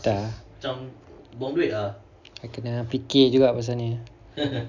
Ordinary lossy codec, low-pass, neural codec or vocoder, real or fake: none; 7.2 kHz; none; real